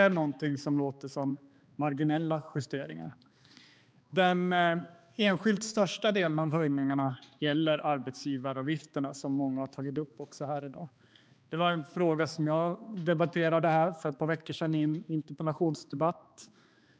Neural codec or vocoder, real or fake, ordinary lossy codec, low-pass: codec, 16 kHz, 2 kbps, X-Codec, HuBERT features, trained on balanced general audio; fake; none; none